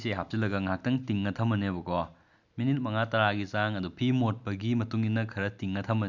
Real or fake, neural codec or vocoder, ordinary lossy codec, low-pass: real; none; none; 7.2 kHz